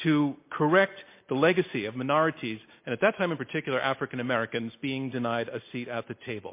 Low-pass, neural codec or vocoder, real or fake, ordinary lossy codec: 3.6 kHz; none; real; MP3, 24 kbps